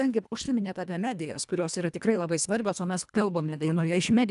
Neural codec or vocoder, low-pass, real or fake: codec, 24 kHz, 1.5 kbps, HILCodec; 10.8 kHz; fake